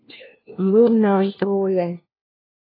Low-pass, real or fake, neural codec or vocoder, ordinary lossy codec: 5.4 kHz; fake; codec, 16 kHz, 1 kbps, FunCodec, trained on LibriTTS, 50 frames a second; AAC, 24 kbps